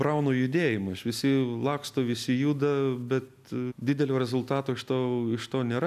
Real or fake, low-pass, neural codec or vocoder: real; 14.4 kHz; none